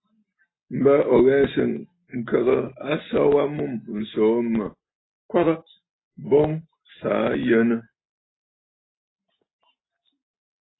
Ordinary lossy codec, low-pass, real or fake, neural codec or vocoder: AAC, 16 kbps; 7.2 kHz; real; none